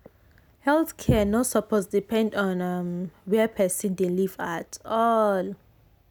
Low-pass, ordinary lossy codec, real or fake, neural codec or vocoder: none; none; real; none